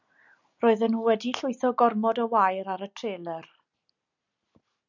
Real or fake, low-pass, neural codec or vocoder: real; 7.2 kHz; none